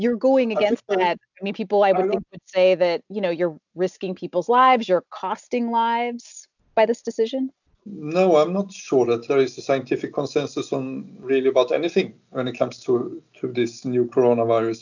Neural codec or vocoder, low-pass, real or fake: none; 7.2 kHz; real